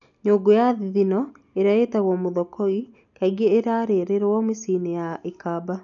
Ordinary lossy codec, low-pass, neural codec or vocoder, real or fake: none; 7.2 kHz; none; real